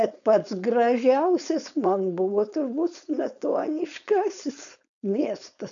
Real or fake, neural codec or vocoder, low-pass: fake; codec, 16 kHz, 4.8 kbps, FACodec; 7.2 kHz